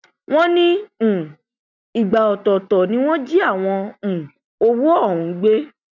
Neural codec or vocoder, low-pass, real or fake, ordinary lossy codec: none; 7.2 kHz; real; none